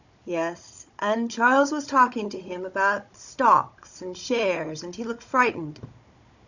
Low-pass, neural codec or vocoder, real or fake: 7.2 kHz; codec, 16 kHz, 16 kbps, FunCodec, trained on Chinese and English, 50 frames a second; fake